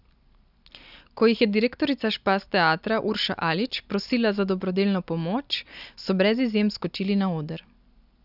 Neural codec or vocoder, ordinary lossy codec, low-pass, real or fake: none; none; 5.4 kHz; real